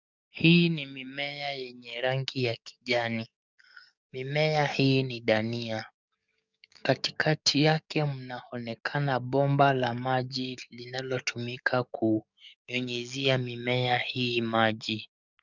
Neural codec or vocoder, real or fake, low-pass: codec, 44.1 kHz, 7.8 kbps, DAC; fake; 7.2 kHz